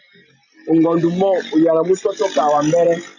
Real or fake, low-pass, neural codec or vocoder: real; 7.2 kHz; none